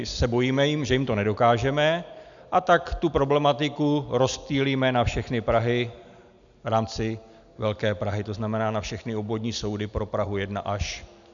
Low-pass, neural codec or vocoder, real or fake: 7.2 kHz; none; real